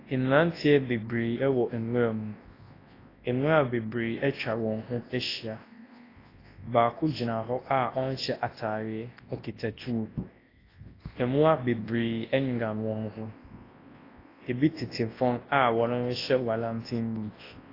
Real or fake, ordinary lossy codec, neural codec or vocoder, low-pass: fake; AAC, 24 kbps; codec, 24 kHz, 0.9 kbps, WavTokenizer, large speech release; 5.4 kHz